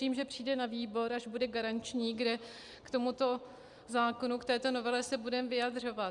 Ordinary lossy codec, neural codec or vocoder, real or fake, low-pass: Opus, 64 kbps; none; real; 10.8 kHz